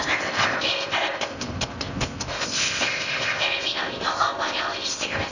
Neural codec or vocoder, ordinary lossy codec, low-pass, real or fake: codec, 16 kHz in and 24 kHz out, 0.6 kbps, FocalCodec, streaming, 4096 codes; none; 7.2 kHz; fake